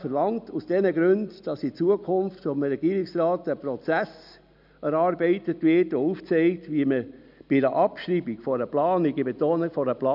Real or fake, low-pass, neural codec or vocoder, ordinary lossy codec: real; 5.4 kHz; none; none